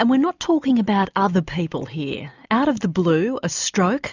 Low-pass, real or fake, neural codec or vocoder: 7.2 kHz; real; none